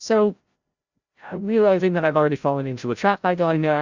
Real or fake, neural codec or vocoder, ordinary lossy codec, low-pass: fake; codec, 16 kHz, 0.5 kbps, FreqCodec, larger model; Opus, 64 kbps; 7.2 kHz